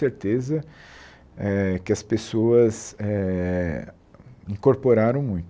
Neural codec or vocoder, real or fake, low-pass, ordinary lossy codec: none; real; none; none